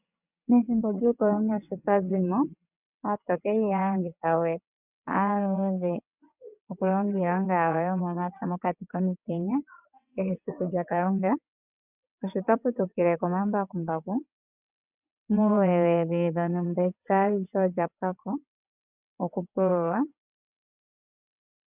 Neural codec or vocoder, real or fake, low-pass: vocoder, 22.05 kHz, 80 mel bands, WaveNeXt; fake; 3.6 kHz